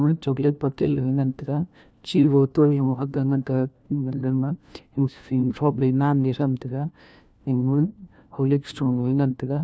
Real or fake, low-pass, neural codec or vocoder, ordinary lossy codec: fake; none; codec, 16 kHz, 1 kbps, FunCodec, trained on LibriTTS, 50 frames a second; none